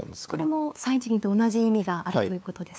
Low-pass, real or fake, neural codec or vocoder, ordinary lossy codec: none; fake; codec, 16 kHz, 2 kbps, FunCodec, trained on LibriTTS, 25 frames a second; none